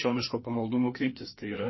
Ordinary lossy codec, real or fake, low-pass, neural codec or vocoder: MP3, 24 kbps; fake; 7.2 kHz; codec, 16 kHz, 2 kbps, FreqCodec, larger model